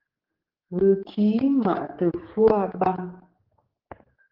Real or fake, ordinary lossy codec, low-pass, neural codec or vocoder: fake; Opus, 16 kbps; 5.4 kHz; codec, 16 kHz, 4 kbps, X-Codec, HuBERT features, trained on general audio